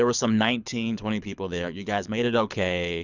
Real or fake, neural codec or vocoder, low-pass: fake; codec, 24 kHz, 6 kbps, HILCodec; 7.2 kHz